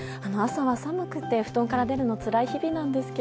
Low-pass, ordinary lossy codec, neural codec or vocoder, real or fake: none; none; none; real